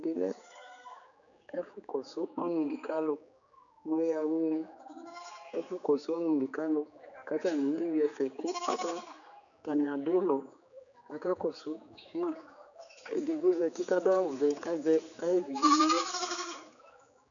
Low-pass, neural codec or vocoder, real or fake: 7.2 kHz; codec, 16 kHz, 4 kbps, X-Codec, HuBERT features, trained on general audio; fake